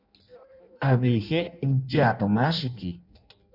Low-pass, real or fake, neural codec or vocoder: 5.4 kHz; fake; codec, 16 kHz in and 24 kHz out, 0.6 kbps, FireRedTTS-2 codec